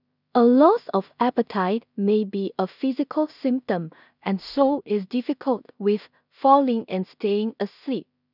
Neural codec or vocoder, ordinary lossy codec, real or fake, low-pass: codec, 16 kHz in and 24 kHz out, 0.4 kbps, LongCat-Audio-Codec, two codebook decoder; none; fake; 5.4 kHz